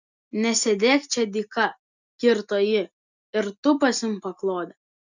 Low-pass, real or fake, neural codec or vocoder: 7.2 kHz; real; none